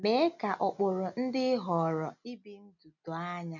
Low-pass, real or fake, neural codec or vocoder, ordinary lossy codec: 7.2 kHz; real; none; none